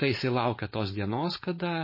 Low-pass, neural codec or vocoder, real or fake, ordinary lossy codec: 5.4 kHz; codec, 16 kHz, 4.8 kbps, FACodec; fake; MP3, 24 kbps